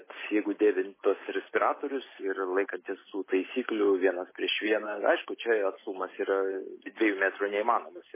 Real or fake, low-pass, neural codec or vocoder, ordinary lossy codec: real; 3.6 kHz; none; MP3, 16 kbps